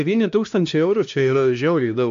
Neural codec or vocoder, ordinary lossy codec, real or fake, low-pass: codec, 16 kHz, 1 kbps, X-Codec, HuBERT features, trained on LibriSpeech; MP3, 64 kbps; fake; 7.2 kHz